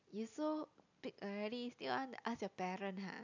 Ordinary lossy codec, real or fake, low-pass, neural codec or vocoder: none; real; 7.2 kHz; none